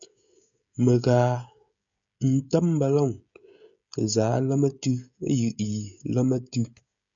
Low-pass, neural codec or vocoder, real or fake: 7.2 kHz; codec, 16 kHz, 16 kbps, FreqCodec, smaller model; fake